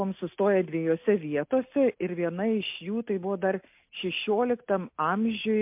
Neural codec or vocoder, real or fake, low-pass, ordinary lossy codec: none; real; 3.6 kHz; MP3, 32 kbps